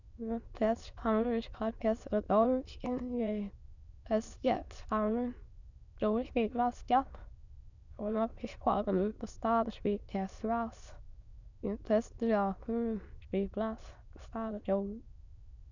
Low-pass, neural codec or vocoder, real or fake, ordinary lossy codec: 7.2 kHz; autoencoder, 22.05 kHz, a latent of 192 numbers a frame, VITS, trained on many speakers; fake; none